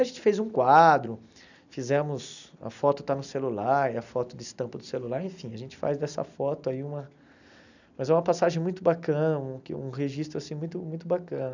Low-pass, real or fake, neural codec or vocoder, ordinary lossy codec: 7.2 kHz; real; none; none